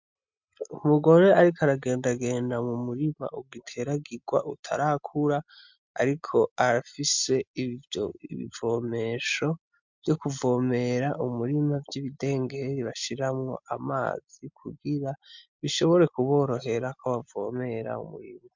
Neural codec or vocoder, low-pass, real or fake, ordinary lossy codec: none; 7.2 kHz; real; MP3, 64 kbps